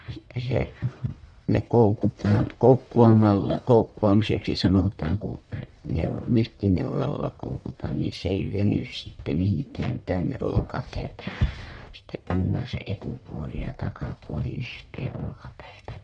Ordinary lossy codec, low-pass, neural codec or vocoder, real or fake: none; 9.9 kHz; codec, 44.1 kHz, 1.7 kbps, Pupu-Codec; fake